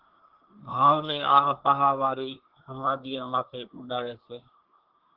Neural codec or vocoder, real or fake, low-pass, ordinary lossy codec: codec, 24 kHz, 1 kbps, SNAC; fake; 5.4 kHz; Opus, 16 kbps